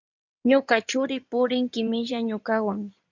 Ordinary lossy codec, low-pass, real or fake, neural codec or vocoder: MP3, 64 kbps; 7.2 kHz; fake; codec, 16 kHz in and 24 kHz out, 2.2 kbps, FireRedTTS-2 codec